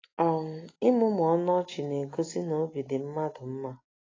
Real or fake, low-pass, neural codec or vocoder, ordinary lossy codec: real; 7.2 kHz; none; AAC, 32 kbps